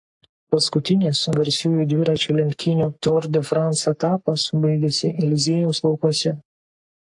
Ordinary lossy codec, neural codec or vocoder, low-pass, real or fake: AAC, 64 kbps; codec, 44.1 kHz, 3.4 kbps, Pupu-Codec; 10.8 kHz; fake